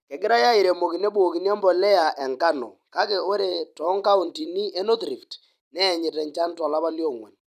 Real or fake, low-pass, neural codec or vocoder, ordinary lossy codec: fake; 14.4 kHz; vocoder, 44.1 kHz, 128 mel bands every 256 samples, BigVGAN v2; none